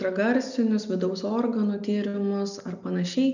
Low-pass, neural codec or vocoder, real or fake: 7.2 kHz; none; real